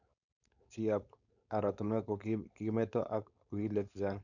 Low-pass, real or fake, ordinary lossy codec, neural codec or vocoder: 7.2 kHz; fake; none; codec, 16 kHz, 4.8 kbps, FACodec